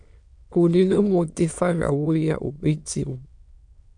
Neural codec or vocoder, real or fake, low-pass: autoencoder, 22.05 kHz, a latent of 192 numbers a frame, VITS, trained on many speakers; fake; 9.9 kHz